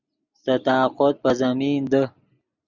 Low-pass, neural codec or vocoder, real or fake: 7.2 kHz; none; real